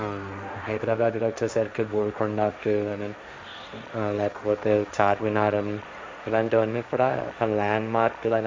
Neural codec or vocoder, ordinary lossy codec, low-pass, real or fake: codec, 16 kHz, 1.1 kbps, Voila-Tokenizer; none; none; fake